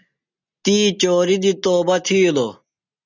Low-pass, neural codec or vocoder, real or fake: 7.2 kHz; none; real